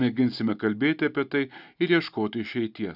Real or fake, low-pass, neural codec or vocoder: real; 5.4 kHz; none